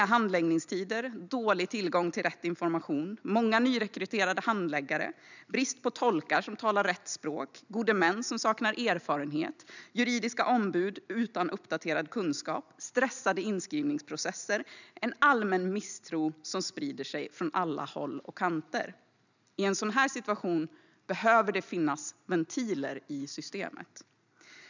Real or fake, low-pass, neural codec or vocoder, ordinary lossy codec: real; 7.2 kHz; none; none